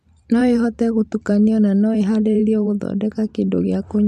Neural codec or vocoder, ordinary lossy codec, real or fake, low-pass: vocoder, 44.1 kHz, 128 mel bands every 512 samples, BigVGAN v2; MP3, 64 kbps; fake; 14.4 kHz